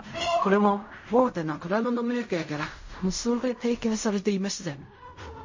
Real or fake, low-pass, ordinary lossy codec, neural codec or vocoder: fake; 7.2 kHz; MP3, 32 kbps; codec, 16 kHz in and 24 kHz out, 0.4 kbps, LongCat-Audio-Codec, fine tuned four codebook decoder